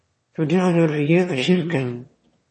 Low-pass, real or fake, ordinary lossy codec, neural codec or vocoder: 9.9 kHz; fake; MP3, 32 kbps; autoencoder, 22.05 kHz, a latent of 192 numbers a frame, VITS, trained on one speaker